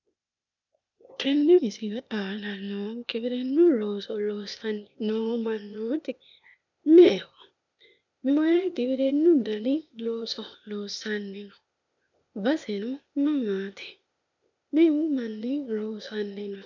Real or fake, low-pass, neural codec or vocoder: fake; 7.2 kHz; codec, 16 kHz, 0.8 kbps, ZipCodec